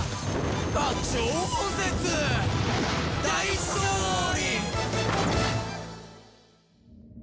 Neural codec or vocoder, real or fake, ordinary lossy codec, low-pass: none; real; none; none